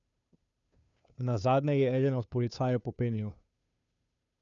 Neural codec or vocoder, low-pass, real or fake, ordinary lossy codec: codec, 16 kHz, 2 kbps, FunCodec, trained on Chinese and English, 25 frames a second; 7.2 kHz; fake; none